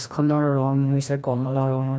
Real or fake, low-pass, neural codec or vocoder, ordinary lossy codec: fake; none; codec, 16 kHz, 1 kbps, FreqCodec, larger model; none